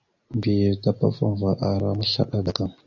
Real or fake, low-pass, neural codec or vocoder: real; 7.2 kHz; none